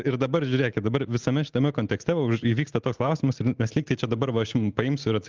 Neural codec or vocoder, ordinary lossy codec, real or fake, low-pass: none; Opus, 24 kbps; real; 7.2 kHz